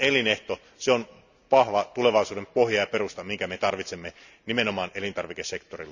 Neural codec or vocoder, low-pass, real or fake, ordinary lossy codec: none; 7.2 kHz; real; none